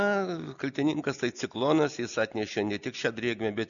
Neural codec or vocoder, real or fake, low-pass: none; real; 7.2 kHz